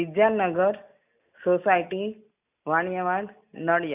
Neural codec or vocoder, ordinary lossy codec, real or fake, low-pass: none; none; real; 3.6 kHz